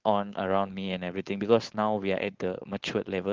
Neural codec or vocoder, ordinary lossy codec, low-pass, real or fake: codec, 16 kHz, 4.8 kbps, FACodec; Opus, 16 kbps; 7.2 kHz; fake